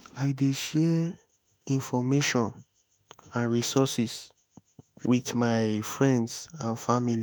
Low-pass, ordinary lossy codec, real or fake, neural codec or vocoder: none; none; fake; autoencoder, 48 kHz, 32 numbers a frame, DAC-VAE, trained on Japanese speech